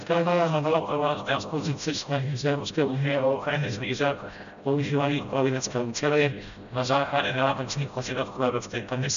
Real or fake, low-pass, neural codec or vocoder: fake; 7.2 kHz; codec, 16 kHz, 0.5 kbps, FreqCodec, smaller model